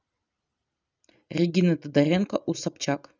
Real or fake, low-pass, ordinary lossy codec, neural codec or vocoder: real; 7.2 kHz; none; none